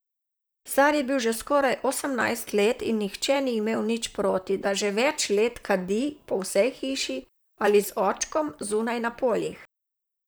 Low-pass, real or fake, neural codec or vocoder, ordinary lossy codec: none; fake; vocoder, 44.1 kHz, 128 mel bands, Pupu-Vocoder; none